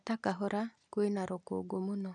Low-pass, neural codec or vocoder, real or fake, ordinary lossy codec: 9.9 kHz; none; real; none